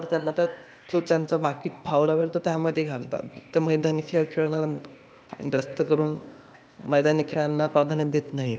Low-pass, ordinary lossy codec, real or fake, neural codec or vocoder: none; none; fake; codec, 16 kHz, 0.8 kbps, ZipCodec